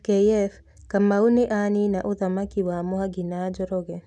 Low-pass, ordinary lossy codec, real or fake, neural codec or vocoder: none; none; real; none